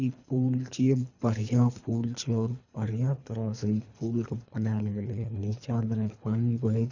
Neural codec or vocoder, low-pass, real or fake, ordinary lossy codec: codec, 24 kHz, 3 kbps, HILCodec; 7.2 kHz; fake; none